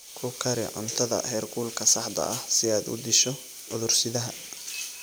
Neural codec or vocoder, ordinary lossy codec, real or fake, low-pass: none; none; real; none